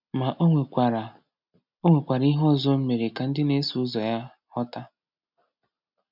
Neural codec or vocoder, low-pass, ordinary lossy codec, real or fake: none; 5.4 kHz; none; real